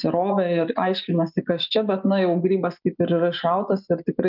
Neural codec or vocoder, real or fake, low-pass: none; real; 5.4 kHz